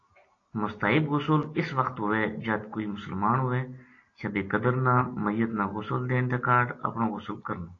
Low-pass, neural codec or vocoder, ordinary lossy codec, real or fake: 7.2 kHz; none; AAC, 32 kbps; real